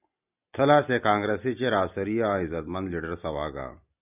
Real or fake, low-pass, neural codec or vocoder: real; 3.6 kHz; none